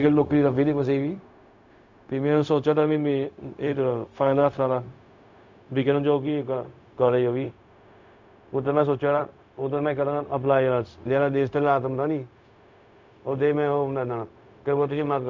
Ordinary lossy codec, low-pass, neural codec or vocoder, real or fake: MP3, 64 kbps; 7.2 kHz; codec, 16 kHz, 0.4 kbps, LongCat-Audio-Codec; fake